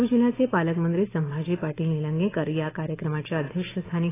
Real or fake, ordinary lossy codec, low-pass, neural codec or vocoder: real; AAC, 16 kbps; 3.6 kHz; none